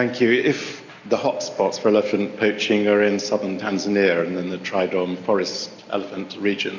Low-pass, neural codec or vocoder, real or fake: 7.2 kHz; none; real